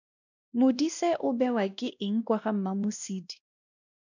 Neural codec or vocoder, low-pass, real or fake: codec, 16 kHz, 1 kbps, X-Codec, WavLM features, trained on Multilingual LibriSpeech; 7.2 kHz; fake